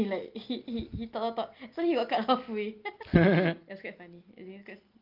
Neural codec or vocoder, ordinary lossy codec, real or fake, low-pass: none; Opus, 32 kbps; real; 5.4 kHz